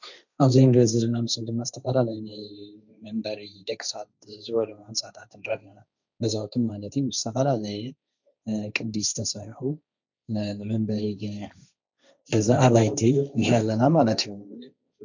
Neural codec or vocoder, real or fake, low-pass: codec, 16 kHz, 1.1 kbps, Voila-Tokenizer; fake; 7.2 kHz